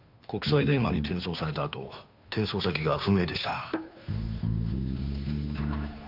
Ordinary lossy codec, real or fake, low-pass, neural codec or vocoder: none; fake; 5.4 kHz; codec, 16 kHz, 2 kbps, FunCodec, trained on Chinese and English, 25 frames a second